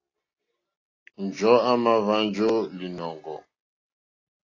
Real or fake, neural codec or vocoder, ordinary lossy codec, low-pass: real; none; AAC, 32 kbps; 7.2 kHz